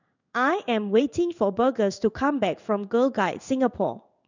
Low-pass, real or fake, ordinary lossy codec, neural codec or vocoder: 7.2 kHz; fake; none; codec, 16 kHz in and 24 kHz out, 1 kbps, XY-Tokenizer